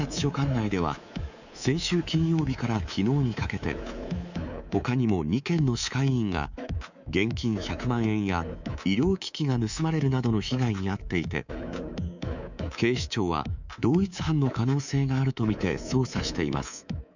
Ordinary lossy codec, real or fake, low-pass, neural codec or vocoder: none; fake; 7.2 kHz; codec, 24 kHz, 3.1 kbps, DualCodec